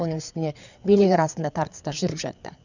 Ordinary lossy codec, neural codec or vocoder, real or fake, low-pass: none; codec, 16 kHz, 4 kbps, FreqCodec, larger model; fake; 7.2 kHz